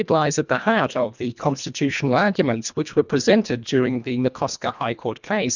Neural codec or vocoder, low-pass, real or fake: codec, 24 kHz, 1.5 kbps, HILCodec; 7.2 kHz; fake